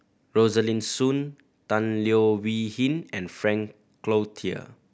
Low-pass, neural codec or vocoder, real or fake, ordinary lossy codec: none; none; real; none